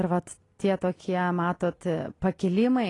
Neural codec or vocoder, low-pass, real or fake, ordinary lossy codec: none; 10.8 kHz; real; AAC, 32 kbps